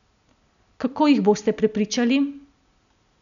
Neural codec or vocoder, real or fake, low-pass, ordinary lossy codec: none; real; 7.2 kHz; none